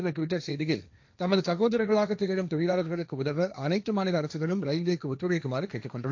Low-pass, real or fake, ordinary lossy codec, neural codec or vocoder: 7.2 kHz; fake; AAC, 48 kbps; codec, 16 kHz, 1.1 kbps, Voila-Tokenizer